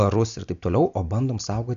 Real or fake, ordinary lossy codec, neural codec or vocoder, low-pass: real; MP3, 64 kbps; none; 7.2 kHz